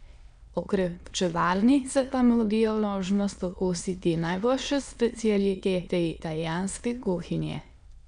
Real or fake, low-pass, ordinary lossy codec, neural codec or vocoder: fake; 9.9 kHz; none; autoencoder, 22.05 kHz, a latent of 192 numbers a frame, VITS, trained on many speakers